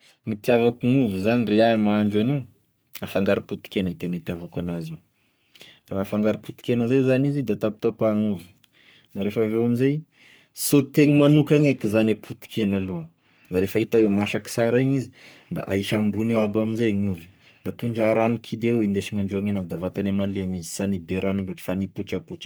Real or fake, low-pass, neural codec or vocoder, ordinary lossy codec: fake; none; codec, 44.1 kHz, 3.4 kbps, Pupu-Codec; none